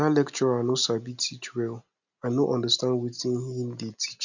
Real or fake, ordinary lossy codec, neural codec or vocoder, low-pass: real; none; none; 7.2 kHz